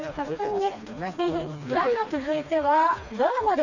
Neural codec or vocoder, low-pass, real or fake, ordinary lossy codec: codec, 16 kHz, 2 kbps, FreqCodec, smaller model; 7.2 kHz; fake; none